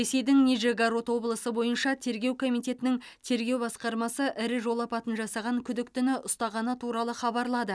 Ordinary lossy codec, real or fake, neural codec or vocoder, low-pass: none; real; none; none